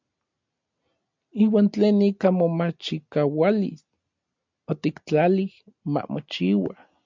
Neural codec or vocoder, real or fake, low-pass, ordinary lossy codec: none; real; 7.2 kHz; MP3, 64 kbps